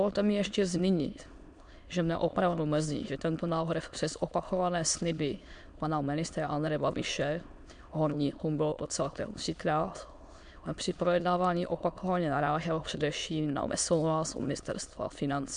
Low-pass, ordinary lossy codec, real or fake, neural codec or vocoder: 9.9 kHz; MP3, 64 kbps; fake; autoencoder, 22.05 kHz, a latent of 192 numbers a frame, VITS, trained on many speakers